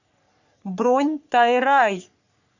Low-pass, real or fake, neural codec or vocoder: 7.2 kHz; fake; codec, 44.1 kHz, 3.4 kbps, Pupu-Codec